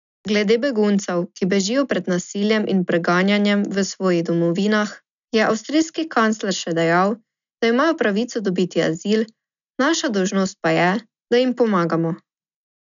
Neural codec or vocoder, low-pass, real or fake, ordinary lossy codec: none; 7.2 kHz; real; none